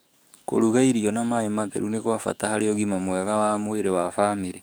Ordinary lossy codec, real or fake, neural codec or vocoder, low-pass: none; fake; codec, 44.1 kHz, 7.8 kbps, DAC; none